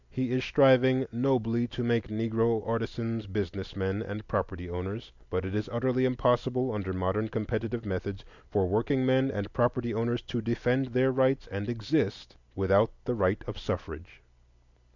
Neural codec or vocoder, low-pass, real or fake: none; 7.2 kHz; real